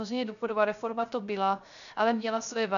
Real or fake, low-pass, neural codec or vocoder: fake; 7.2 kHz; codec, 16 kHz, 0.3 kbps, FocalCodec